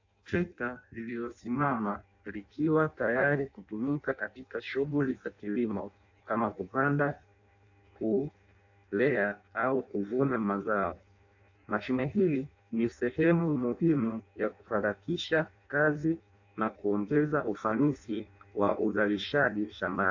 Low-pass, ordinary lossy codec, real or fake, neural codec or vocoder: 7.2 kHz; AAC, 48 kbps; fake; codec, 16 kHz in and 24 kHz out, 0.6 kbps, FireRedTTS-2 codec